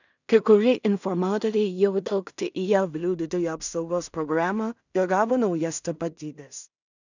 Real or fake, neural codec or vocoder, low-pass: fake; codec, 16 kHz in and 24 kHz out, 0.4 kbps, LongCat-Audio-Codec, two codebook decoder; 7.2 kHz